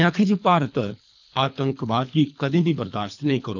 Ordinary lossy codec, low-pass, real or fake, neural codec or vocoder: none; 7.2 kHz; fake; codec, 24 kHz, 3 kbps, HILCodec